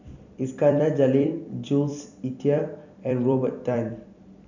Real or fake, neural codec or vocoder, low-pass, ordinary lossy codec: fake; vocoder, 44.1 kHz, 128 mel bands every 256 samples, BigVGAN v2; 7.2 kHz; none